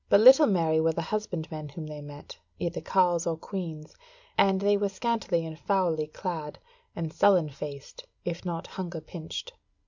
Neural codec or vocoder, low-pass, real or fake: none; 7.2 kHz; real